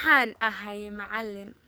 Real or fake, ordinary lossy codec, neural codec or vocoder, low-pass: fake; none; codec, 44.1 kHz, 2.6 kbps, SNAC; none